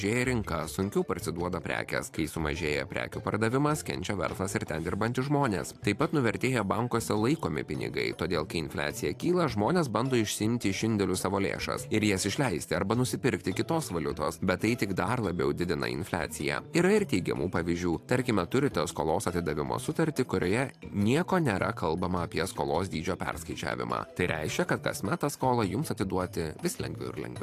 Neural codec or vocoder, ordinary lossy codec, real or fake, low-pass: vocoder, 44.1 kHz, 128 mel bands every 512 samples, BigVGAN v2; AAC, 64 kbps; fake; 14.4 kHz